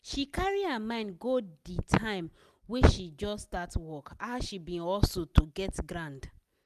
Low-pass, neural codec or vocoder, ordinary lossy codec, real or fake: 14.4 kHz; none; none; real